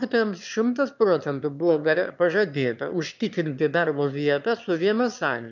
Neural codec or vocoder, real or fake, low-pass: autoencoder, 22.05 kHz, a latent of 192 numbers a frame, VITS, trained on one speaker; fake; 7.2 kHz